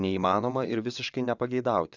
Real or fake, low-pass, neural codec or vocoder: fake; 7.2 kHz; vocoder, 22.05 kHz, 80 mel bands, WaveNeXt